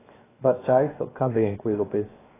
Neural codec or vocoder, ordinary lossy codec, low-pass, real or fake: codec, 16 kHz, 0.7 kbps, FocalCodec; AAC, 16 kbps; 3.6 kHz; fake